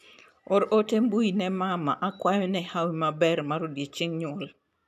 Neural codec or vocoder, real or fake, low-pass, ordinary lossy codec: none; real; 14.4 kHz; none